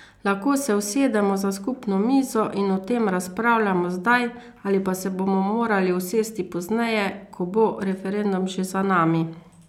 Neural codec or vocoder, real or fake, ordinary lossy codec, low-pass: none; real; none; 19.8 kHz